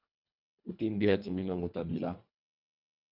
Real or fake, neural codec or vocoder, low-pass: fake; codec, 24 kHz, 1.5 kbps, HILCodec; 5.4 kHz